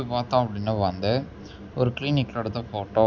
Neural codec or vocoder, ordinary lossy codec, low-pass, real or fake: none; Opus, 64 kbps; 7.2 kHz; real